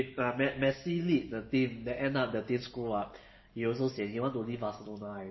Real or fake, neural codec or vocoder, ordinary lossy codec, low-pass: real; none; MP3, 24 kbps; 7.2 kHz